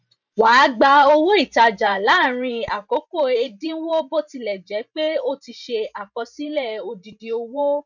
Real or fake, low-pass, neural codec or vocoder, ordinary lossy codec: fake; 7.2 kHz; vocoder, 44.1 kHz, 128 mel bands every 512 samples, BigVGAN v2; none